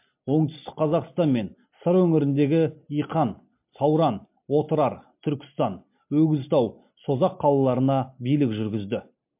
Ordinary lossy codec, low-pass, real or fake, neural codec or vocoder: MP3, 32 kbps; 3.6 kHz; real; none